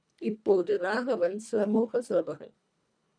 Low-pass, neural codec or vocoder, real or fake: 9.9 kHz; codec, 24 kHz, 1.5 kbps, HILCodec; fake